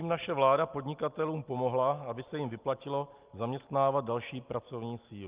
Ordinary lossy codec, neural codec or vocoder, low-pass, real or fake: Opus, 24 kbps; none; 3.6 kHz; real